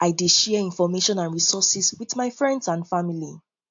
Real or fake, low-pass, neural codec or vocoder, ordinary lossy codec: real; 7.2 kHz; none; AAC, 64 kbps